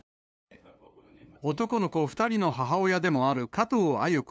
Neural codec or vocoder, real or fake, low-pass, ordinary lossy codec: codec, 16 kHz, 2 kbps, FunCodec, trained on LibriTTS, 25 frames a second; fake; none; none